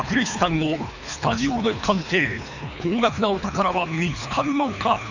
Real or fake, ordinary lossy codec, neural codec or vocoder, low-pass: fake; none; codec, 24 kHz, 3 kbps, HILCodec; 7.2 kHz